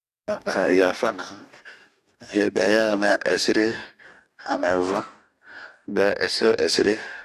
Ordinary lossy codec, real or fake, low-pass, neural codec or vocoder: none; fake; 14.4 kHz; codec, 44.1 kHz, 2.6 kbps, DAC